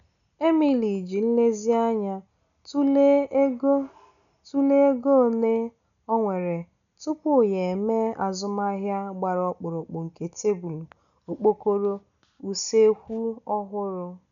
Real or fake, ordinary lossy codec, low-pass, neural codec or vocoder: real; none; 7.2 kHz; none